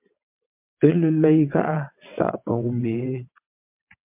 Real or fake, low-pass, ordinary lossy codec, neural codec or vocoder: fake; 3.6 kHz; MP3, 32 kbps; vocoder, 22.05 kHz, 80 mel bands, WaveNeXt